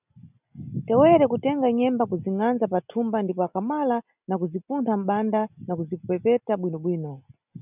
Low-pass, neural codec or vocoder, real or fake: 3.6 kHz; none; real